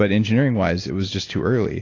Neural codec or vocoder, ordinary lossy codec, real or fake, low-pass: none; AAC, 32 kbps; real; 7.2 kHz